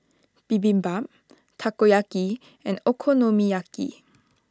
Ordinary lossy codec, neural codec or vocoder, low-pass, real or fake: none; none; none; real